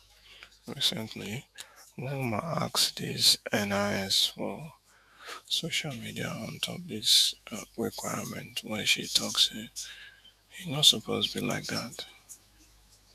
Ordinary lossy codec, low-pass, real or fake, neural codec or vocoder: none; 14.4 kHz; fake; autoencoder, 48 kHz, 128 numbers a frame, DAC-VAE, trained on Japanese speech